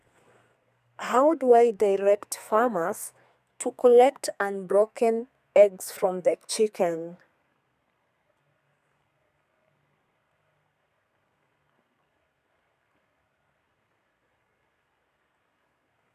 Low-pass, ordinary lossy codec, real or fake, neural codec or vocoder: 14.4 kHz; none; fake; codec, 32 kHz, 1.9 kbps, SNAC